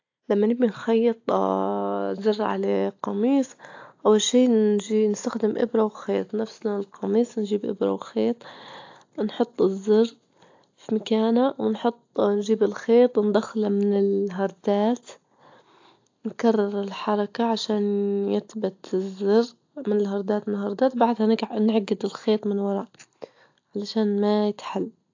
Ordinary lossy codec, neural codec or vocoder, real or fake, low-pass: AAC, 48 kbps; none; real; 7.2 kHz